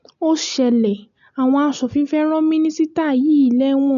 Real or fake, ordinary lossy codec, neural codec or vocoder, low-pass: real; none; none; 7.2 kHz